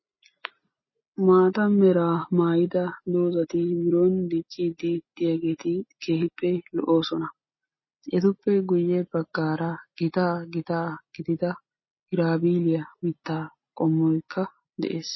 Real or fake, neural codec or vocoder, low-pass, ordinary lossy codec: real; none; 7.2 kHz; MP3, 24 kbps